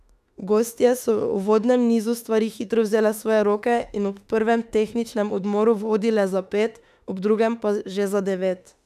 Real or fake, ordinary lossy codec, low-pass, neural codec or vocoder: fake; none; 14.4 kHz; autoencoder, 48 kHz, 32 numbers a frame, DAC-VAE, trained on Japanese speech